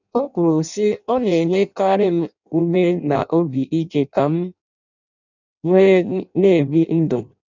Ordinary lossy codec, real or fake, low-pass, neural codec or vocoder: none; fake; 7.2 kHz; codec, 16 kHz in and 24 kHz out, 0.6 kbps, FireRedTTS-2 codec